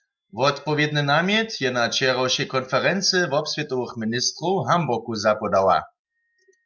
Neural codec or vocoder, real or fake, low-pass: none; real; 7.2 kHz